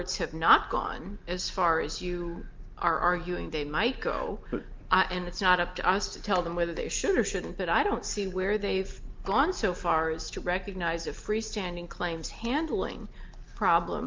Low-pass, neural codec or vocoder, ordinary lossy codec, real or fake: 7.2 kHz; none; Opus, 32 kbps; real